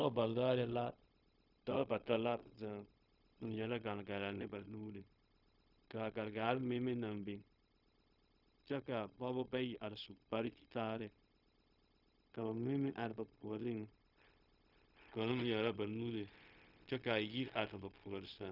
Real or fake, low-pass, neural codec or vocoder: fake; 5.4 kHz; codec, 16 kHz, 0.4 kbps, LongCat-Audio-Codec